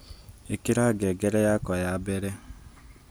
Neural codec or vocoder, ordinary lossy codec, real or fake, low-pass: none; none; real; none